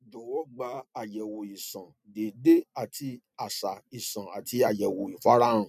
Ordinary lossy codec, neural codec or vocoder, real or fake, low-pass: none; none; real; 14.4 kHz